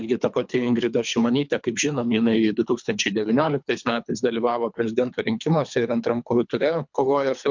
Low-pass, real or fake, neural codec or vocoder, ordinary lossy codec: 7.2 kHz; fake; codec, 24 kHz, 3 kbps, HILCodec; MP3, 64 kbps